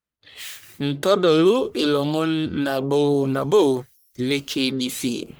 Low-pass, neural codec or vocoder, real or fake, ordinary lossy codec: none; codec, 44.1 kHz, 1.7 kbps, Pupu-Codec; fake; none